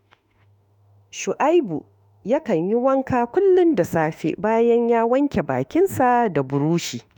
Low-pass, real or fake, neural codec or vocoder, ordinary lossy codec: 19.8 kHz; fake; autoencoder, 48 kHz, 32 numbers a frame, DAC-VAE, trained on Japanese speech; none